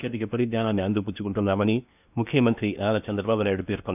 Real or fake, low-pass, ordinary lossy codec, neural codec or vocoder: fake; 3.6 kHz; none; codec, 16 kHz in and 24 kHz out, 0.8 kbps, FocalCodec, streaming, 65536 codes